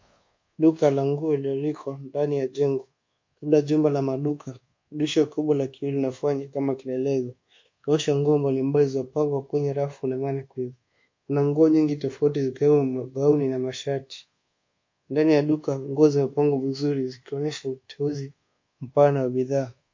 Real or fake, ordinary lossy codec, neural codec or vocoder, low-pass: fake; MP3, 48 kbps; codec, 24 kHz, 1.2 kbps, DualCodec; 7.2 kHz